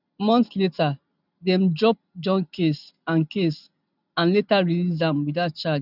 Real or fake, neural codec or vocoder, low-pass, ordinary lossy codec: real; none; 5.4 kHz; none